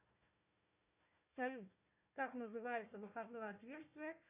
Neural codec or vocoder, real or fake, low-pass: codec, 16 kHz, 1 kbps, FunCodec, trained on Chinese and English, 50 frames a second; fake; 3.6 kHz